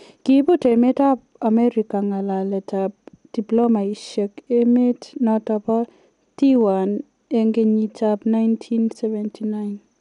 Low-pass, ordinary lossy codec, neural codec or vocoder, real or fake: 10.8 kHz; none; none; real